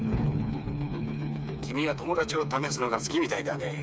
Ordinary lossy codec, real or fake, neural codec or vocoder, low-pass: none; fake; codec, 16 kHz, 4 kbps, FreqCodec, smaller model; none